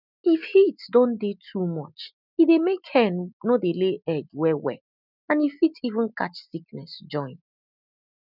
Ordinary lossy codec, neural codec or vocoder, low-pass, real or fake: none; none; 5.4 kHz; real